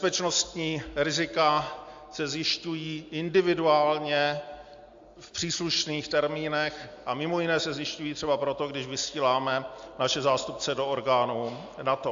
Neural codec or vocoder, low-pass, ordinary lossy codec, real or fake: none; 7.2 kHz; AAC, 64 kbps; real